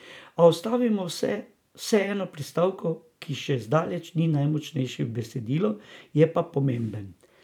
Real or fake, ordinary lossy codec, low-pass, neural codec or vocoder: fake; none; 19.8 kHz; vocoder, 44.1 kHz, 128 mel bands every 512 samples, BigVGAN v2